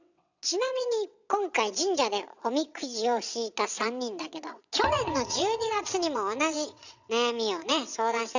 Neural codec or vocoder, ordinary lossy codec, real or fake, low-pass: vocoder, 22.05 kHz, 80 mel bands, WaveNeXt; none; fake; 7.2 kHz